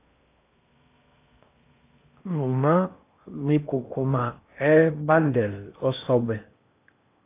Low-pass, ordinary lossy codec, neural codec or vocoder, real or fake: 3.6 kHz; AAC, 24 kbps; codec, 16 kHz in and 24 kHz out, 0.8 kbps, FocalCodec, streaming, 65536 codes; fake